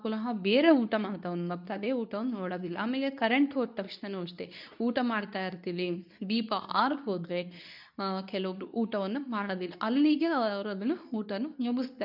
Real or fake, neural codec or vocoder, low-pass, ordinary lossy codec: fake; codec, 24 kHz, 0.9 kbps, WavTokenizer, medium speech release version 2; 5.4 kHz; none